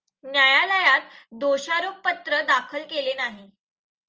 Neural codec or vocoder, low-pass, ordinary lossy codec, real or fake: none; 7.2 kHz; Opus, 24 kbps; real